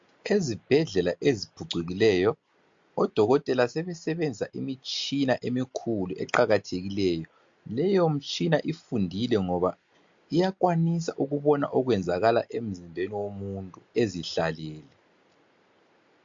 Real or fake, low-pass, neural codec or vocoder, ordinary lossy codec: real; 7.2 kHz; none; MP3, 48 kbps